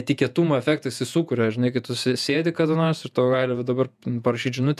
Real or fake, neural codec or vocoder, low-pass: fake; vocoder, 48 kHz, 128 mel bands, Vocos; 14.4 kHz